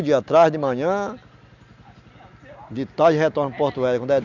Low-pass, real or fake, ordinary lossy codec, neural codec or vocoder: 7.2 kHz; real; none; none